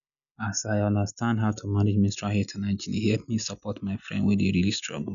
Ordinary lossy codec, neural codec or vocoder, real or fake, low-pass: none; none; real; 7.2 kHz